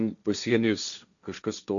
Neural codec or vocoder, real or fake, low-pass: codec, 16 kHz, 1.1 kbps, Voila-Tokenizer; fake; 7.2 kHz